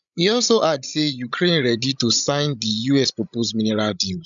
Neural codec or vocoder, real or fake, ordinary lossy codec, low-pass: codec, 16 kHz, 16 kbps, FreqCodec, larger model; fake; MP3, 96 kbps; 7.2 kHz